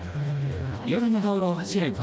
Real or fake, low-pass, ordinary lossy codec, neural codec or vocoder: fake; none; none; codec, 16 kHz, 1 kbps, FreqCodec, smaller model